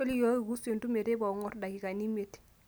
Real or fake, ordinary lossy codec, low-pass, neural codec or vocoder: real; none; none; none